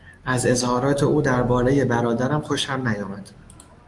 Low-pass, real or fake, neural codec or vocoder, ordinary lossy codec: 10.8 kHz; fake; autoencoder, 48 kHz, 128 numbers a frame, DAC-VAE, trained on Japanese speech; Opus, 32 kbps